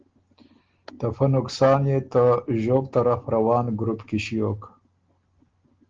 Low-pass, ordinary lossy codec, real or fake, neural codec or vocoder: 7.2 kHz; Opus, 16 kbps; real; none